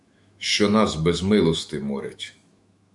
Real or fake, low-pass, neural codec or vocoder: fake; 10.8 kHz; autoencoder, 48 kHz, 128 numbers a frame, DAC-VAE, trained on Japanese speech